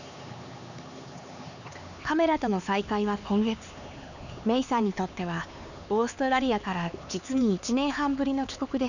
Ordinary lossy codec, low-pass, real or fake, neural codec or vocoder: none; 7.2 kHz; fake; codec, 16 kHz, 4 kbps, X-Codec, HuBERT features, trained on LibriSpeech